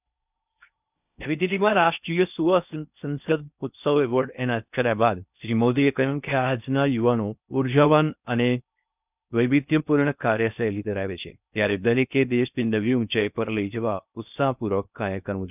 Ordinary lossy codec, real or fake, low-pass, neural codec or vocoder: none; fake; 3.6 kHz; codec, 16 kHz in and 24 kHz out, 0.6 kbps, FocalCodec, streaming, 4096 codes